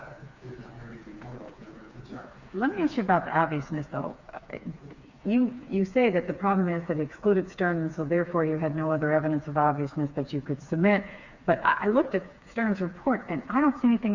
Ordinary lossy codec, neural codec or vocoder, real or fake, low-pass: Opus, 64 kbps; codec, 16 kHz, 4 kbps, FreqCodec, smaller model; fake; 7.2 kHz